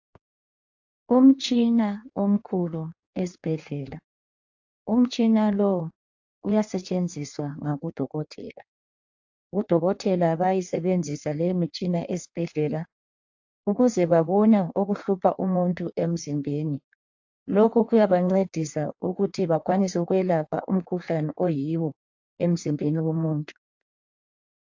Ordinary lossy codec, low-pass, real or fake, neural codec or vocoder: AAC, 48 kbps; 7.2 kHz; fake; codec, 16 kHz in and 24 kHz out, 1.1 kbps, FireRedTTS-2 codec